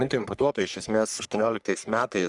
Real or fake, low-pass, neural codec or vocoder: fake; 10.8 kHz; codec, 44.1 kHz, 3.4 kbps, Pupu-Codec